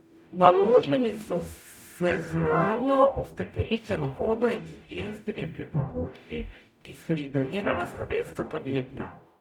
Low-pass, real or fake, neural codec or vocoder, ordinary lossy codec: 19.8 kHz; fake; codec, 44.1 kHz, 0.9 kbps, DAC; none